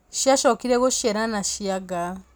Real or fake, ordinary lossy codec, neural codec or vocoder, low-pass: real; none; none; none